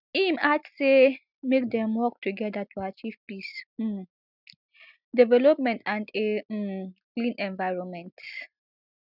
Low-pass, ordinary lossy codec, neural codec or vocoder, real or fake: 5.4 kHz; none; none; real